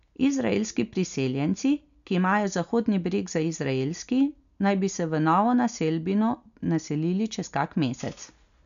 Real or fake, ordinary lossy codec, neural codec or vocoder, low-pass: real; none; none; 7.2 kHz